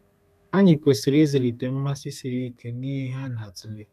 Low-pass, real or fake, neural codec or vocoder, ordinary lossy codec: 14.4 kHz; fake; codec, 32 kHz, 1.9 kbps, SNAC; none